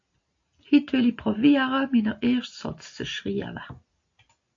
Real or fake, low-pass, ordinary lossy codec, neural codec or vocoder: real; 7.2 kHz; AAC, 48 kbps; none